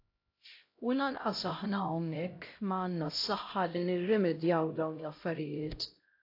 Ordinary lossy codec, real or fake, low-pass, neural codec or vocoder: AAC, 32 kbps; fake; 5.4 kHz; codec, 16 kHz, 0.5 kbps, X-Codec, HuBERT features, trained on LibriSpeech